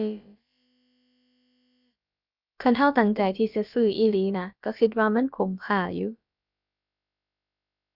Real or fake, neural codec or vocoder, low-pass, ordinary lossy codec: fake; codec, 16 kHz, about 1 kbps, DyCAST, with the encoder's durations; 5.4 kHz; none